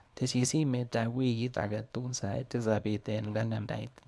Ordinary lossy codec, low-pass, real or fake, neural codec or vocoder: none; none; fake; codec, 24 kHz, 0.9 kbps, WavTokenizer, small release